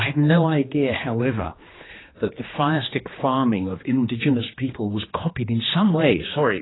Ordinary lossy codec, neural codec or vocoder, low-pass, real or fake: AAC, 16 kbps; codec, 16 kHz, 2 kbps, X-Codec, HuBERT features, trained on general audio; 7.2 kHz; fake